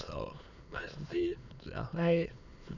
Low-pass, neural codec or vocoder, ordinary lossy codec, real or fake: 7.2 kHz; autoencoder, 22.05 kHz, a latent of 192 numbers a frame, VITS, trained on many speakers; none; fake